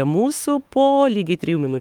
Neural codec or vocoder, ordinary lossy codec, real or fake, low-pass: autoencoder, 48 kHz, 32 numbers a frame, DAC-VAE, trained on Japanese speech; Opus, 32 kbps; fake; 19.8 kHz